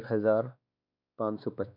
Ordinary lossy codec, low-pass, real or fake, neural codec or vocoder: none; 5.4 kHz; fake; codec, 16 kHz, 2 kbps, X-Codec, WavLM features, trained on Multilingual LibriSpeech